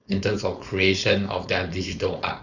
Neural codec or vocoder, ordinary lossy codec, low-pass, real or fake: codec, 16 kHz, 4.8 kbps, FACodec; none; 7.2 kHz; fake